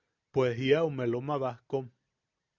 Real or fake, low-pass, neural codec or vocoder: real; 7.2 kHz; none